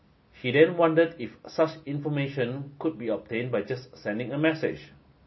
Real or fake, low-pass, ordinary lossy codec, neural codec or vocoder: real; 7.2 kHz; MP3, 24 kbps; none